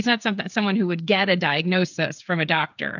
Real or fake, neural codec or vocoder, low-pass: fake; codec, 16 kHz, 8 kbps, FreqCodec, smaller model; 7.2 kHz